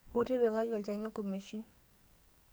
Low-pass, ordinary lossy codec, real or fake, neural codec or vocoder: none; none; fake; codec, 44.1 kHz, 2.6 kbps, SNAC